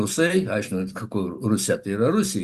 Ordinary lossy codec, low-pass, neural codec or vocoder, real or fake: Opus, 64 kbps; 14.4 kHz; none; real